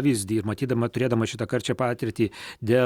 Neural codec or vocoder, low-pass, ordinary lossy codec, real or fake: none; 19.8 kHz; Opus, 64 kbps; real